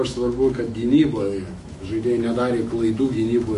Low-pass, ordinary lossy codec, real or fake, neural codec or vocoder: 14.4 kHz; MP3, 48 kbps; fake; autoencoder, 48 kHz, 128 numbers a frame, DAC-VAE, trained on Japanese speech